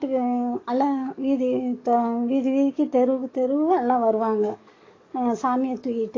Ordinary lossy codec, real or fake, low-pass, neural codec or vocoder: AAC, 32 kbps; fake; 7.2 kHz; codec, 44.1 kHz, 7.8 kbps, DAC